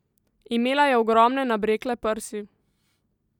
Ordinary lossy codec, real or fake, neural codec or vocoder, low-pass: none; real; none; 19.8 kHz